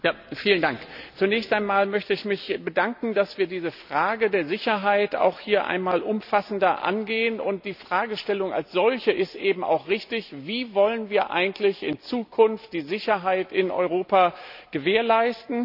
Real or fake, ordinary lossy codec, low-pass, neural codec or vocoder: real; none; 5.4 kHz; none